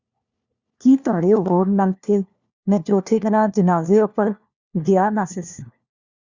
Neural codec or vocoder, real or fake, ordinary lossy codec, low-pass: codec, 16 kHz, 1 kbps, FunCodec, trained on LibriTTS, 50 frames a second; fake; Opus, 64 kbps; 7.2 kHz